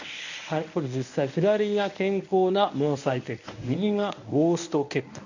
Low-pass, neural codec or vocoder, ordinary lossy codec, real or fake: 7.2 kHz; codec, 24 kHz, 0.9 kbps, WavTokenizer, medium speech release version 1; none; fake